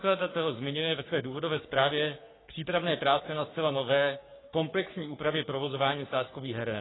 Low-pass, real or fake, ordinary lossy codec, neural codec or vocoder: 7.2 kHz; fake; AAC, 16 kbps; codec, 44.1 kHz, 3.4 kbps, Pupu-Codec